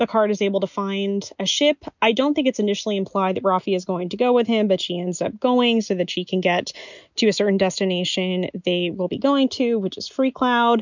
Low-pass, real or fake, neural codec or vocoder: 7.2 kHz; real; none